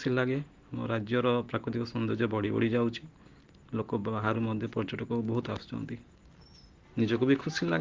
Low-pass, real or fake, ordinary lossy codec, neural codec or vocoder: 7.2 kHz; real; Opus, 16 kbps; none